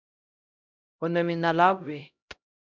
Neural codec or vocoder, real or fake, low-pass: codec, 16 kHz, 0.5 kbps, X-Codec, HuBERT features, trained on LibriSpeech; fake; 7.2 kHz